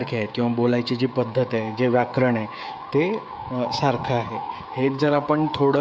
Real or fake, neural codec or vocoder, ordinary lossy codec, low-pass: fake; codec, 16 kHz, 16 kbps, FreqCodec, smaller model; none; none